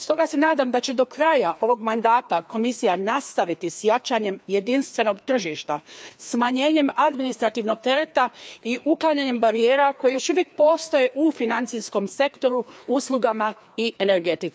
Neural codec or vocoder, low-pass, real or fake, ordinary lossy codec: codec, 16 kHz, 2 kbps, FreqCodec, larger model; none; fake; none